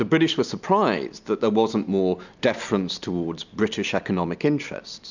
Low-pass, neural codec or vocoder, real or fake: 7.2 kHz; none; real